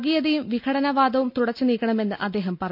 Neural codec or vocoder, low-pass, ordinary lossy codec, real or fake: none; 5.4 kHz; none; real